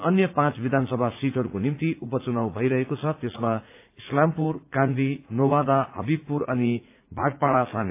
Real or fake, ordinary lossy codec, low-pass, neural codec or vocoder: fake; AAC, 24 kbps; 3.6 kHz; vocoder, 44.1 kHz, 80 mel bands, Vocos